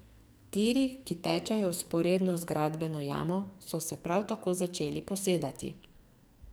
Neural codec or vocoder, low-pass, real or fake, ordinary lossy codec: codec, 44.1 kHz, 2.6 kbps, SNAC; none; fake; none